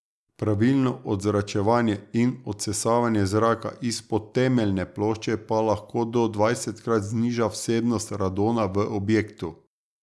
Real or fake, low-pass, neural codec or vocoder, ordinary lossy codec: real; none; none; none